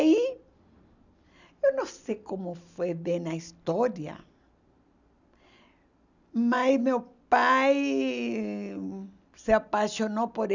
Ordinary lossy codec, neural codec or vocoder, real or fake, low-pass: none; none; real; 7.2 kHz